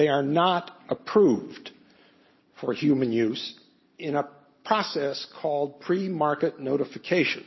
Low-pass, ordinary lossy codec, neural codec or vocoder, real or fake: 7.2 kHz; MP3, 24 kbps; none; real